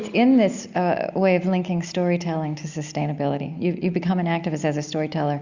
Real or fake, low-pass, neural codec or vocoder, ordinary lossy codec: real; 7.2 kHz; none; Opus, 64 kbps